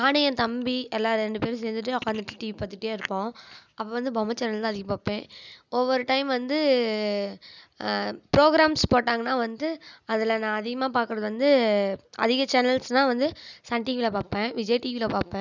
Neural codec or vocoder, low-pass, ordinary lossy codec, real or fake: none; 7.2 kHz; none; real